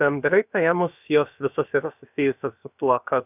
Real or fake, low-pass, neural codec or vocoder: fake; 3.6 kHz; codec, 16 kHz, about 1 kbps, DyCAST, with the encoder's durations